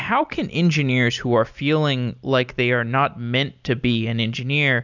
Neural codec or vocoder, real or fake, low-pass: none; real; 7.2 kHz